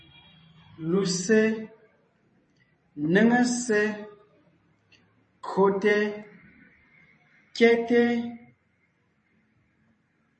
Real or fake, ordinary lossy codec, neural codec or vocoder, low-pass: fake; MP3, 32 kbps; vocoder, 44.1 kHz, 128 mel bands every 512 samples, BigVGAN v2; 10.8 kHz